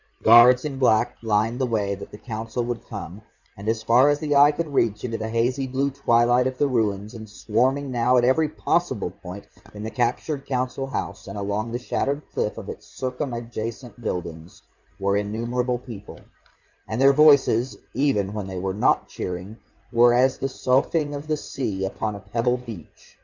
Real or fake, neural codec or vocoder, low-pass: fake; codec, 16 kHz in and 24 kHz out, 2.2 kbps, FireRedTTS-2 codec; 7.2 kHz